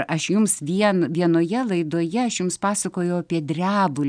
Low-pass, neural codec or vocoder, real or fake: 9.9 kHz; none; real